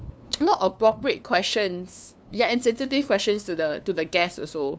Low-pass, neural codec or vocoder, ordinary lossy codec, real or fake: none; codec, 16 kHz, 2 kbps, FunCodec, trained on LibriTTS, 25 frames a second; none; fake